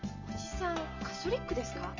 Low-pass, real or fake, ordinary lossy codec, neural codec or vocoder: 7.2 kHz; real; none; none